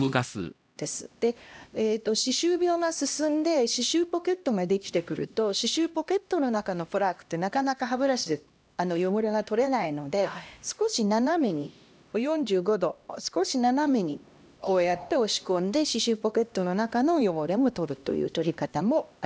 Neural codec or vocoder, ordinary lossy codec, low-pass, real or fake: codec, 16 kHz, 1 kbps, X-Codec, HuBERT features, trained on LibriSpeech; none; none; fake